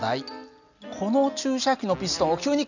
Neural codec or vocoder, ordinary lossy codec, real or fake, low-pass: none; none; real; 7.2 kHz